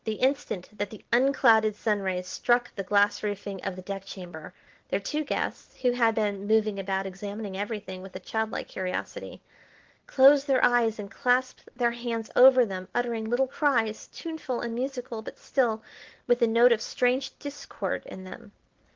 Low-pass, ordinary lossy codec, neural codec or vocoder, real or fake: 7.2 kHz; Opus, 16 kbps; none; real